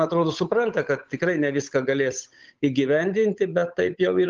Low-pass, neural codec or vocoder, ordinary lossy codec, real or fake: 7.2 kHz; codec, 16 kHz, 8 kbps, FunCodec, trained on Chinese and English, 25 frames a second; Opus, 24 kbps; fake